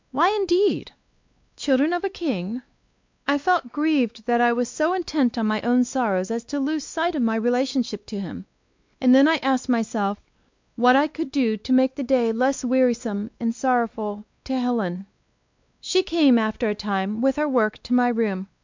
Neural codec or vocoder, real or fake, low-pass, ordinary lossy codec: codec, 16 kHz, 2 kbps, X-Codec, WavLM features, trained on Multilingual LibriSpeech; fake; 7.2 kHz; MP3, 64 kbps